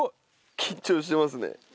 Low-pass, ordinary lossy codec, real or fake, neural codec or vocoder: none; none; real; none